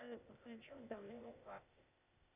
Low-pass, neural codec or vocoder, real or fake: 3.6 kHz; codec, 16 kHz, 0.8 kbps, ZipCodec; fake